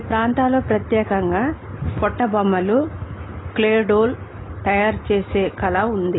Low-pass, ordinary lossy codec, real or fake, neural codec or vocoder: 7.2 kHz; AAC, 16 kbps; real; none